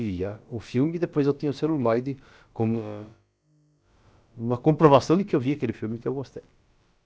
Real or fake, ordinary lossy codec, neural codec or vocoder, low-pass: fake; none; codec, 16 kHz, about 1 kbps, DyCAST, with the encoder's durations; none